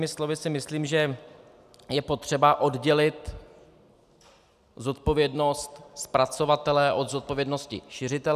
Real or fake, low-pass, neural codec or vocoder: real; 14.4 kHz; none